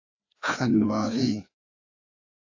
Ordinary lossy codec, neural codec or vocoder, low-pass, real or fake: AAC, 48 kbps; codec, 16 kHz, 2 kbps, FreqCodec, larger model; 7.2 kHz; fake